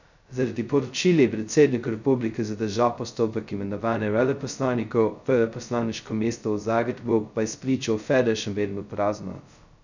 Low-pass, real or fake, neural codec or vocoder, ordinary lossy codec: 7.2 kHz; fake; codec, 16 kHz, 0.2 kbps, FocalCodec; none